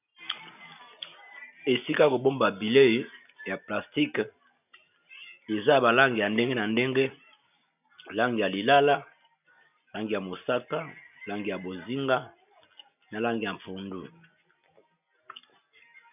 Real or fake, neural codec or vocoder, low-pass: real; none; 3.6 kHz